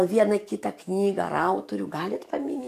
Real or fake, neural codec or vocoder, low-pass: fake; codec, 44.1 kHz, 7.8 kbps, DAC; 14.4 kHz